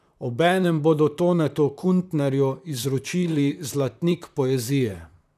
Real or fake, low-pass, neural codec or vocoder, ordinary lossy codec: fake; 14.4 kHz; vocoder, 44.1 kHz, 128 mel bands, Pupu-Vocoder; none